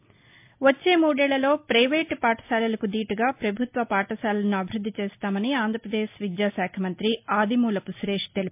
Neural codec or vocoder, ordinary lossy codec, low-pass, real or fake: none; MP3, 32 kbps; 3.6 kHz; real